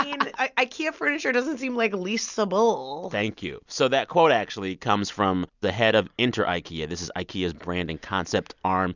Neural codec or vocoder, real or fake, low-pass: none; real; 7.2 kHz